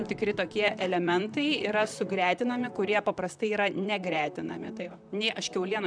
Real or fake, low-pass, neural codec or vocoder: fake; 9.9 kHz; vocoder, 44.1 kHz, 128 mel bands, Pupu-Vocoder